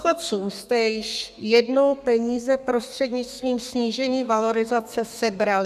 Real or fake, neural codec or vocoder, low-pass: fake; codec, 32 kHz, 1.9 kbps, SNAC; 14.4 kHz